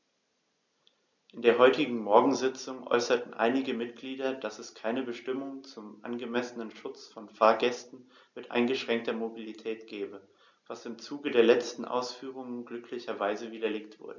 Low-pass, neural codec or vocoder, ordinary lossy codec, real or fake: 7.2 kHz; none; none; real